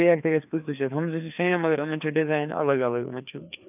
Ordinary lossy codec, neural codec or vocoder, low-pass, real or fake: none; codec, 16 kHz, 2 kbps, FreqCodec, larger model; 3.6 kHz; fake